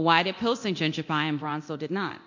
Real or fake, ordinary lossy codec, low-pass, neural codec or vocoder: fake; MP3, 48 kbps; 7.2 kHz; codec, 24 kHz, 0.9 kbps, DualCodec